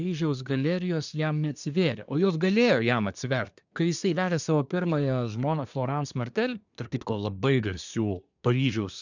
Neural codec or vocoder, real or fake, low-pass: codec, 24 kHz, 1 kbps, SNAC; fake; 7.2 kHz